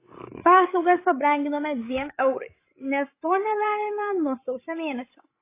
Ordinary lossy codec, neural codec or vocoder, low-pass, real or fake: AAC, 24 kbps; codec, 16 kHz, 8 kbps, FreqCodec, larger model; 3.6 kHz; fake